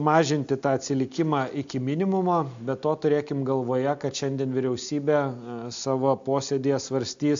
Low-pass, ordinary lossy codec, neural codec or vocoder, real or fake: 7.2 kHz; AAC, 64 kbps; none; real